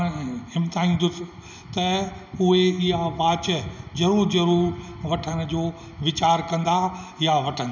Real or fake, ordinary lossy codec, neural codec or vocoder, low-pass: real; none; none; 7.2 kHz